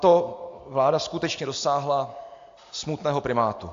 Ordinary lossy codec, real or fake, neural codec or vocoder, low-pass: AAC, 48 kbps; real; none; 7.2 kHz